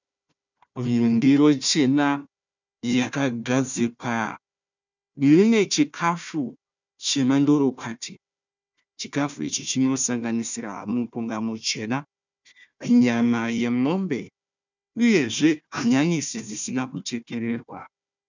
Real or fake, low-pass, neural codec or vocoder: fake; 7.2 kHz; codec, 16 kHz, 1 kbps, FunCodec, trained on Chinese and English, 50 frames a second